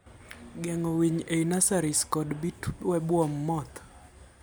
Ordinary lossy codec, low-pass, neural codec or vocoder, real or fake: none; none; none; real